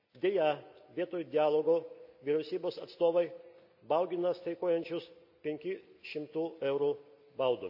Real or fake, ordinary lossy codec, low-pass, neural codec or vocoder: real; none; 5.4 kHz; none